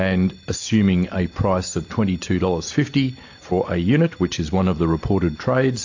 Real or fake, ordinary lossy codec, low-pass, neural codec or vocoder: real; AAC, 48 kbps; 7.2 kHz; none